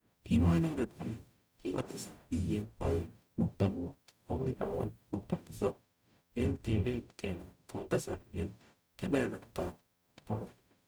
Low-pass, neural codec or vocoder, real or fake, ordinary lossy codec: none; codec, 44.1 kHz, 0.9 kbps, DAC; fake; none